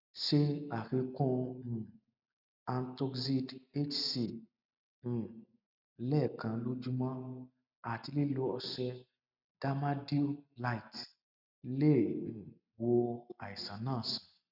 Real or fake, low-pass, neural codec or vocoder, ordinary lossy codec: real; 5.4 kHz; none; none